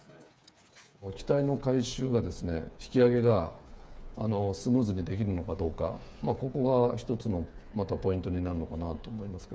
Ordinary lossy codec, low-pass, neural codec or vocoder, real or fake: none; none; codec, 16 kHz, 8 kbps, FreqCodec, smaller model; fake